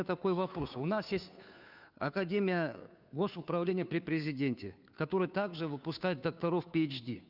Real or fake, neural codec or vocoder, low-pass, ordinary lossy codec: fake; codec, 16 kHz, 2 kbps, FunCodec, trained on Chinese and English, 25 frames a second; 5.4 kHz; none